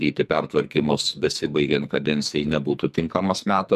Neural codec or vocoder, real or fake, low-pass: codec, 44.1 kHz, 2.6 kbps, SNAC; fake; 14.4 kHz